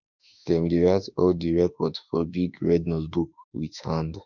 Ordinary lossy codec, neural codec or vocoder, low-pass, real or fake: none; autoencoder, 48 kHz, 32 numbers a frame, DAC-VAE, trained on Japanese speech; 7.2 kHz; fake